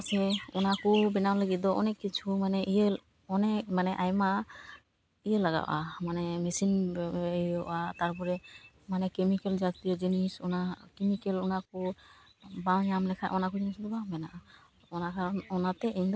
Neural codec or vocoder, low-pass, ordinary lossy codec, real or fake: none; none; none; real